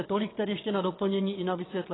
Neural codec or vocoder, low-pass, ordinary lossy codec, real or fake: vocoder, 22.05 kHz, 80 mel bands, WaveNeXt; 7.2 kHz; AAC, 16 kbps; fake